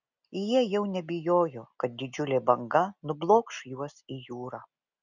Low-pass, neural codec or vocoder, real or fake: 7.2 kHz; none; real